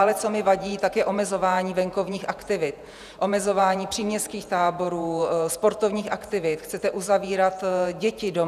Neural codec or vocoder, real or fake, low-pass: vocoder, 48 kHz, 128 mel bands, Vocos; fake; 14.4 kHz